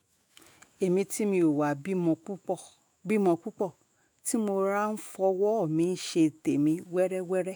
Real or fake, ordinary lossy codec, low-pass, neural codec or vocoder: fake; none; none; autoencoder, 48 kHz, 128 numbers a frame, DAC-VAE, trained on Japanese speech